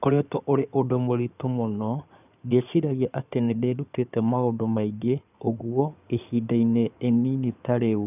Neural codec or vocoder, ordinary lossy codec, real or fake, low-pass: codec, 16 kHz in and 24 kHz out, 2.2 kbps, FireRedTTS-2 codec; none; fake; 3.6 kHz